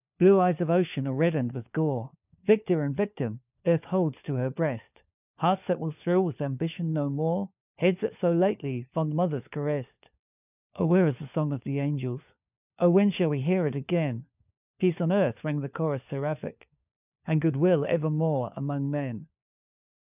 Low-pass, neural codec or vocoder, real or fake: 3.6 kHz; codec, 16 kHz, 4 kbps, FunCodec, trained on LibriTTS, 50 frames a second; fake